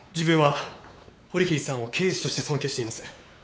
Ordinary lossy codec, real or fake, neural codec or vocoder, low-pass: none; fake; codec, 16 kHz, 4 kbps, X-Codec, WavLM features, trained on Multilingual LibriSpeech; none